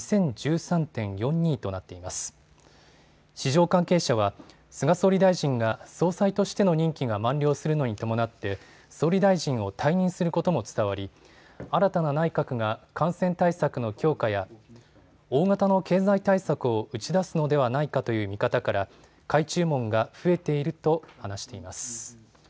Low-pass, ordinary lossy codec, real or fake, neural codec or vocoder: none; none; real; none